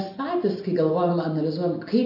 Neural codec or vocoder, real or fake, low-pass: none; real; 5.4 kHz